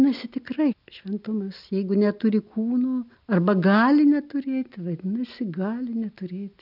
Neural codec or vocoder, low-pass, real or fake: none; 5.4 kHz; real